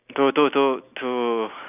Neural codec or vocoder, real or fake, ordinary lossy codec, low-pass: none; real; none; 3.6 kHz